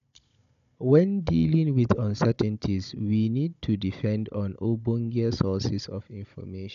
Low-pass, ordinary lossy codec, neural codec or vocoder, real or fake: 7.2 kHz; none; codec, 16 kHz, 16 kbps, FunCodec, trained on Chinese and English, 50 frames a second; fake